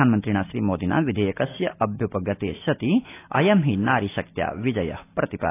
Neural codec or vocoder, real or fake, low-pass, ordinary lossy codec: none; real; 3.6 kHz; AAC, 24 kbps